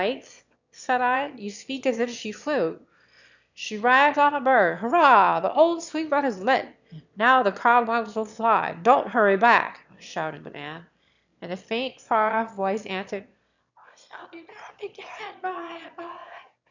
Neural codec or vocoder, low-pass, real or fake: autoencoder, 22.05 kHz, a latent of 192 numbers a frame, VITS, trained on one speaker; 7.2 kHz; fake